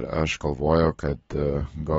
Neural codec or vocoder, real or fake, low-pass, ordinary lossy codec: codec, 44.1 kHz, 7.8 kbps, DAC; fake; 19.8 kHz; AAC, 24 kbps